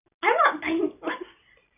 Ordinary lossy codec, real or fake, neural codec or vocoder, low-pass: none; real; none; 3.6 kHz